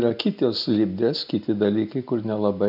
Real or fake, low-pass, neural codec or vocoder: real; 5.4 kHz; none